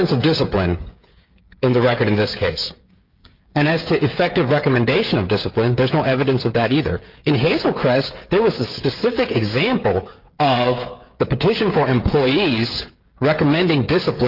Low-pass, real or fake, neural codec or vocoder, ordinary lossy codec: 5.4 kHz; fake; codec, 16 kHz, 16 kbps, FreqCodec, smaller model; Opus, 32 kbps